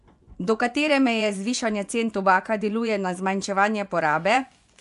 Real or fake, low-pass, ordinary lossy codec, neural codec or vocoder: fake; 10.8 kHz; AAC, 96 kbps; vocoder, 24 kHz, 100 mel bands, Vocos